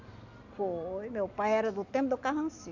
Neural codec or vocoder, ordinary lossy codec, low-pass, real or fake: none; none; 7.2 kHz; real